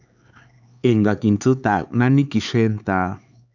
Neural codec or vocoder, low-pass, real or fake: codec, 16 kHz, 4 kbps, X-Codec, HuBERT features, trained on LibriSpeech; 7.2 kHz; fake